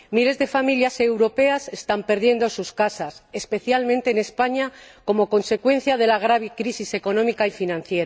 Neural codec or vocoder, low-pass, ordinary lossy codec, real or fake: none; none; none; real